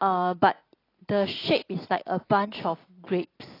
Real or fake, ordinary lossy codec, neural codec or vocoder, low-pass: real; AAC, 24 kbps; none; 5.4 kHz